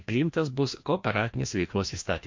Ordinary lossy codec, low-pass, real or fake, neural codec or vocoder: MP3, 48 kbps; 7.2 kHz; fake; codec, 16 kHz, 1 kbps, FreqCodec, larger model